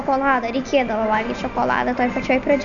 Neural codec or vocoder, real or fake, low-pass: none; real; 7.2 kHz